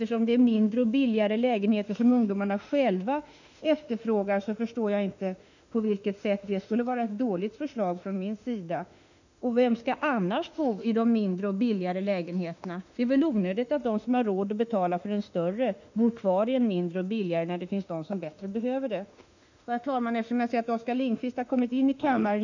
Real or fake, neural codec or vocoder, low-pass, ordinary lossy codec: fake; autoencoder, 48 kHz, 32 numbers a frame, DAC-VAE, trained on Japanese speech; 7.2 kHz; none